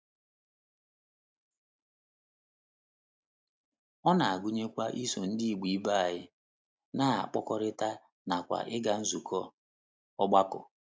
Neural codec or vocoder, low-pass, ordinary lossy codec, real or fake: none; none; none; real